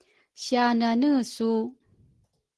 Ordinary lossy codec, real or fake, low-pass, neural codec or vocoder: Opus, 16 kbps; real; 10.8 kHz; none